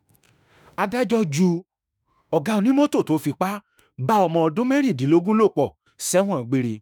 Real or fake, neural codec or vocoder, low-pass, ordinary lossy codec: fake; autoencoder, 48 kHz, 32 numbers a frame, DAC-VAE, trained on Japanese speech; none; none